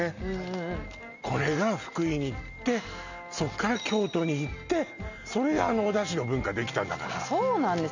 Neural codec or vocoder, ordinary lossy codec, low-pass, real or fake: none; none; 7.2 kHz; real